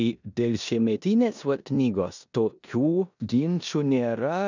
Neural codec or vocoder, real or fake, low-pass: codec, 16 kHz in and 24 kHz out, 0.9 kbps, LongCat-Audio-Codec, four codebook decoder; fake; 7.2 kHz